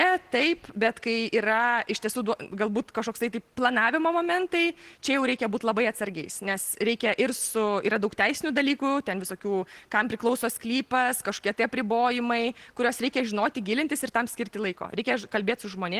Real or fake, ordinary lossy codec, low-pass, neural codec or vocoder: real; Opus, 16 kbps; 14.4 kHz; none